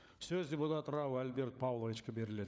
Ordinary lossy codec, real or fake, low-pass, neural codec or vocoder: none; fake; none; codec, 16 kHz, 16 kbps, FunCodec, trained on LibriTTS, 50 frames a second